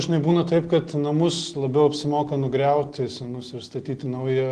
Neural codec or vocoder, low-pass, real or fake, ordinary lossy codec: none; 9.9 kHz; real; Opus, 16 kbps